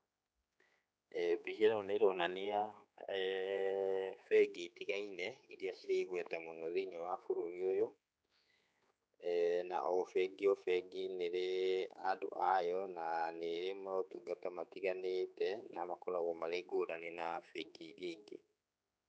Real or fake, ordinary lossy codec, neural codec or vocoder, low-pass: fake; none; codec, 16 kHz, 4 kbps, X-Codec, HuBERT features, trained on general audio; none